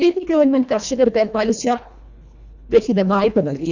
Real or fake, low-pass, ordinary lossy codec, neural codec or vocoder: fake; 7.2 kHz; none; codec, 24 kHz, 1.5 kbps, HILCodec